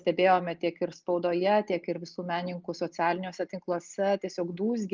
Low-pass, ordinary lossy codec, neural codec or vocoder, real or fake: 7.2 kHz; Opus, 32 kbps; none; real